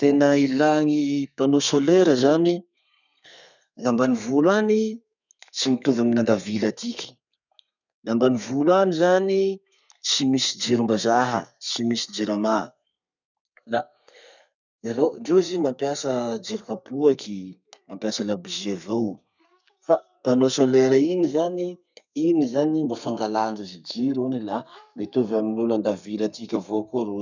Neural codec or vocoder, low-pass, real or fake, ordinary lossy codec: codec, 32 kHz, 1.9 kbps, SNAC; 7.2 kHz; fake; none